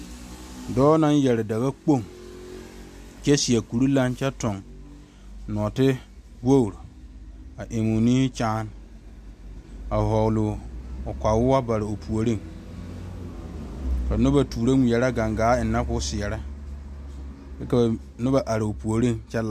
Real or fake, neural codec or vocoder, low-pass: real; none; 14.4 kHz